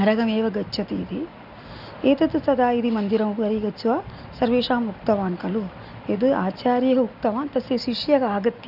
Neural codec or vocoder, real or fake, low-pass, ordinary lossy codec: none; real; 5.4 kHz; none